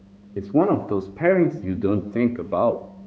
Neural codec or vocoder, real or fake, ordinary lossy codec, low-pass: codec, 16 kHz, 4 kbps, X-Codec, HuBERT features, trained on balanced general audio; fake; none; none